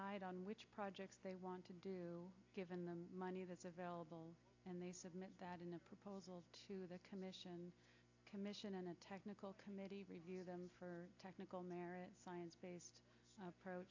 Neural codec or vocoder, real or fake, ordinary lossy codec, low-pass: none; real; AAC, 48 kbps; 7.2 kHz